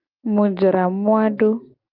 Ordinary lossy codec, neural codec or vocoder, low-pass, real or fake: Opus, 24 kbps; none; 5.4 kHz; real